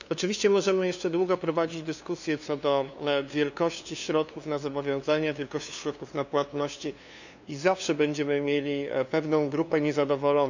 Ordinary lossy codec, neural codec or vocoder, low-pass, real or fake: none; codec, 16 kHz, 2 kbps, FunCodec, trained on LibriTTS, 25 frames a second; 7.2 kHz; fake